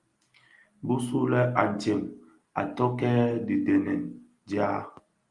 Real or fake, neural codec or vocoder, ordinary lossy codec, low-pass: real; none; Opus, 32 kbps; 10.8 kHz